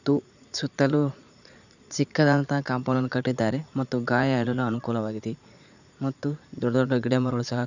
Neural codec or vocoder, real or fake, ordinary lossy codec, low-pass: vocoder, 44.1 kHz, 80 mel bands, Vocos; fake; none; 7.2 kHz